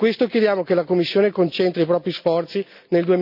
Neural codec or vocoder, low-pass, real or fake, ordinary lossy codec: none; 5.4 kHz; real; none